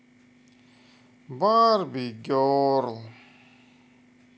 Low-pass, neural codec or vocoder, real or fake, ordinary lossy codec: none; none; real; none